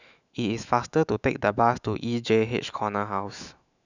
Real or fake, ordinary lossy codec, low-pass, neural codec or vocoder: fake; none; 7.2 kHz; autoencoder, 48 kHz, 128 numbers a frame, DAC-VAE, trained on Japanese speech